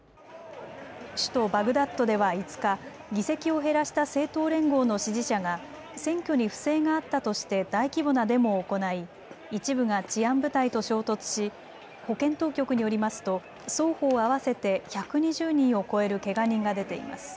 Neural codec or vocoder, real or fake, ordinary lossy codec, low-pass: none; real; none; none